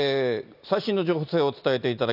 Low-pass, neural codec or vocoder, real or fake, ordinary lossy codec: 5.4 kHz; none; real; none